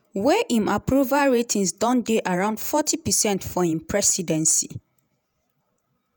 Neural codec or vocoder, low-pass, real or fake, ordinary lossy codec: vocoder, 48 kHz, 128 mel bands, Vocos; none; fake; none